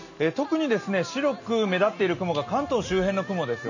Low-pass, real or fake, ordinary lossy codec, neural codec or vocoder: 7.2 kHz; real; none; none